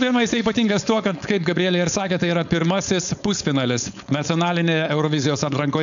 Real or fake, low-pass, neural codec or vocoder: fake; 7.2 kHz; codec, 16 kHz, 4.8 kbps, FACodec